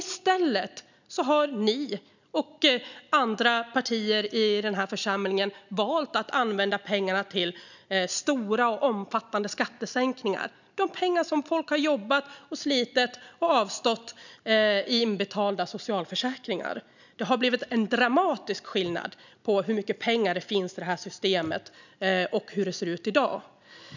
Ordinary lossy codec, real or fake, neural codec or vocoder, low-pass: none; real; none; 7.2 kHz